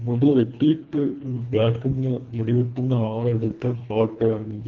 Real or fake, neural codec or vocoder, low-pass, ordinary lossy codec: fake; codec, 24 kHz, 1.5 kbps, HILCodec; 7.2 kHz; Opus, 24 kbps